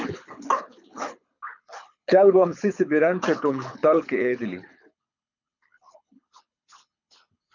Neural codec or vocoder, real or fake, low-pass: codec, 24 kHz, 6 kbps, HILCodec; fake; 7.2 kHz